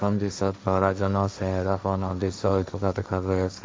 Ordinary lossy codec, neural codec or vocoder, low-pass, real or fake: none; codec, 16 kHz, 1.1 kbps, Voila-Tokenizer; none; fake